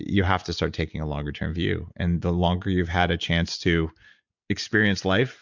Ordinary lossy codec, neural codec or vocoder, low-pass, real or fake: MP3, 64 kbps; none; 7.2 kHz; real